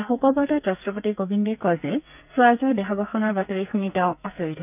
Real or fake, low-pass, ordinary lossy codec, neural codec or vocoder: fake; 3.6 kHz; none; codec, 44.1 kHz, 2.6 kbps, SNAC